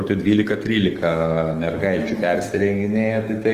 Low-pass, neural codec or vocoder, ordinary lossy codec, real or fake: 14.4 kHz; codec, 44.1 kHz, 7.8 kbps, DAC; Opus, 24 kbps; fake